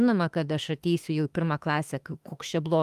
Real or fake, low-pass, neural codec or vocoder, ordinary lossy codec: fake; 14.4 kHz; autoencoder, 48 kHz, 32 numbers a frame, DAC-VAE, trained on Japanese speech; Opus, 32 kbps